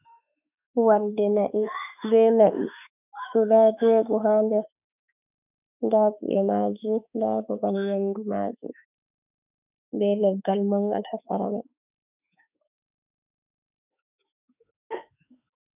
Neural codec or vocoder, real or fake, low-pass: autoencoder, 48 kHz, 32 numbers a frame, DAC-VAE, trained on Japanese speech; fake; 3.6 kHz